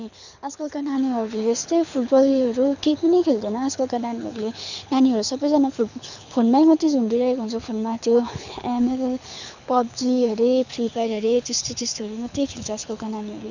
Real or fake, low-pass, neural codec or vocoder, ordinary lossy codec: fake; 7.2 kHz; codec, 24 kHz, 6 kbps, HILCodec; none